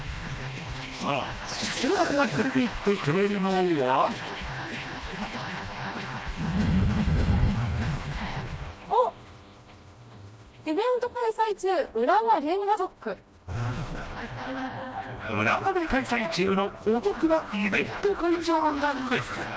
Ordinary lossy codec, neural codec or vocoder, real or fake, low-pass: none; codec, 16 kHz, 1 kbps, FreqCodec, smaller model; fake; none